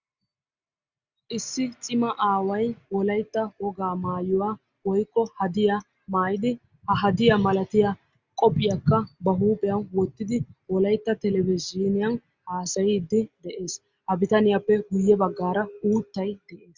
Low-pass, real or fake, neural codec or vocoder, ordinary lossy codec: 7.2 kHz; real; none; Opus, 64 kbps